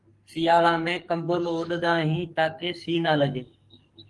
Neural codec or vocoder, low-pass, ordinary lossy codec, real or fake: codec, 44.1 kHz, 2.6 kbps, SNAC; 10.8 kHz; Opus, 24 kbps; fake